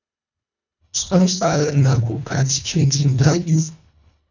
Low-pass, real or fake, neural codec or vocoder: 7.2 kHz; fake; codec, 24 kHz, 1.5 kbps, HILCodec